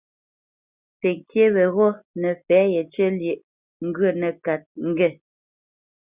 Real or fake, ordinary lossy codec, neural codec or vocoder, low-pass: real; Opus, 64 kbps; none; 3.6 kHz